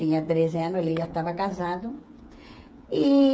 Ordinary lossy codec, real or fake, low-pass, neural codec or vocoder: none; fake; none; codec, 16 kHz, 8 kbps, FreqCodec, smaller model